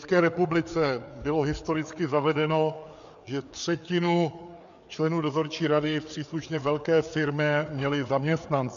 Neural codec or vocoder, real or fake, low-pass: codec, 16 kHz, 4 kbps, FreqCodec, larger model; fake; 7.2 kHz